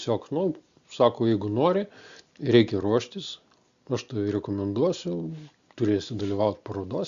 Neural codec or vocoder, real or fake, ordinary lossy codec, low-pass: none; real; Opus, 64 kbps; 7.2 kHz